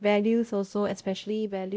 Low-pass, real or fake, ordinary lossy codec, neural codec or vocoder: none; fake; none; codec, 16 kHz, 0.5 kbps, X-Codec, WavLM features, trained on Multilingual LibriSpeech